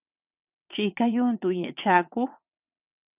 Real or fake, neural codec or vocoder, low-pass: fake; vocoder, 22.05 kHz, 80 mel bands, WaveNeXt; 3.6 kHz